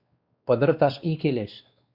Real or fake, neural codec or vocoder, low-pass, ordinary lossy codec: fake; codec, 16 kHz, 2 kbps, X-Codec, WavLM features, trained on Multilingual LibriSpeech; 5.4 kHz; Opus, 64 kbps